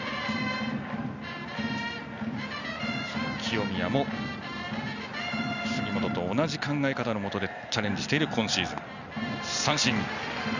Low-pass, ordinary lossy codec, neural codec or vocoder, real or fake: 7.2 kHz; none; none; real